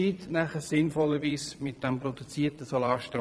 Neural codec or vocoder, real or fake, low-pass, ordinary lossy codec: vocoder, 22.05 kHz, 80 mel bands, Vocos; fake; none; none